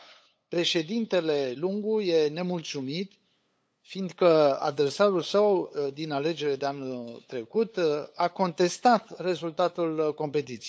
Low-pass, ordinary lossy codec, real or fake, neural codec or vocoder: none; none; fake; codec, 16 kHz, 8 kbps, FunCodec, trained on LibriTTS, 25 frames a second